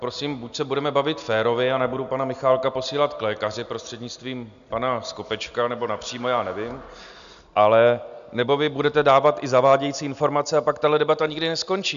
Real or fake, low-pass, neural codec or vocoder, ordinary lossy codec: real; 7.2 kHz; none; AAC, 96 kbps